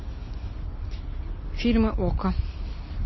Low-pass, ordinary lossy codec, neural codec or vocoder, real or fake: 7.2 kHz; MP3, 24 kbps; none; real